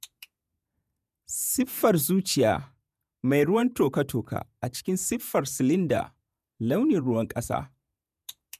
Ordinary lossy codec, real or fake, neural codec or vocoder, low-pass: none; fake; vocoder, 48 kHz, 128 mel bands, Vocos; 14.4 kHz